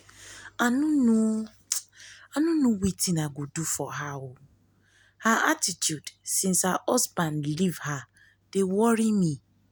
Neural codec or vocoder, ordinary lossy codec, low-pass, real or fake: none; none; none; real